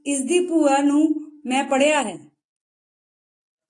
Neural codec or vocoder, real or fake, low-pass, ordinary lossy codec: none; real; 10.8 kHz; AAC, 48 kbps